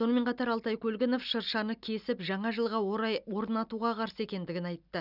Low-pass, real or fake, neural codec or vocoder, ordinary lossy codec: 5.4 kHz; real; none; none